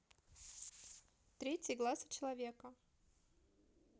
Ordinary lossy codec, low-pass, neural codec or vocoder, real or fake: none; none; none; real